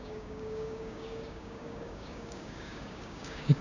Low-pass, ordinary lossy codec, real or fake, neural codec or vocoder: 7.2 kHz; none; fake; codec, 16 kHz, 6 kbps, DAC